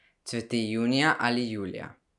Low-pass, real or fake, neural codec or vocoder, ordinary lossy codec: 10.8 kHz; real; none; none